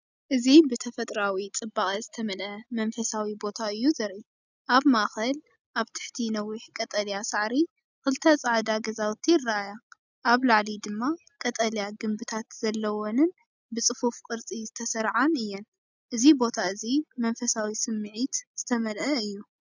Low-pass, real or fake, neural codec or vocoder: 7.2 kHz; real; none